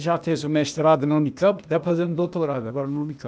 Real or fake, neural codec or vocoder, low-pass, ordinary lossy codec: fake; codec, 16 kHz, 0.8 kbps, ZipCodec; none; none